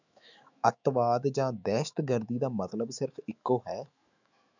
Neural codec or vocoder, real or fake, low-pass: autoencoder, 48 kHz, 128 numbers a frame, DAC-VAE, trained on Japanese speech; fake; 7.2 kHz